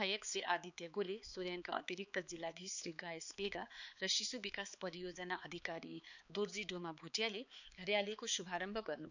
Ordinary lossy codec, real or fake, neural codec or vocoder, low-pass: none; fake; codec, 16 kHz, 4 kbps, X-Codec, HuBERT features, trained on balanced general audio; 7.2 kHz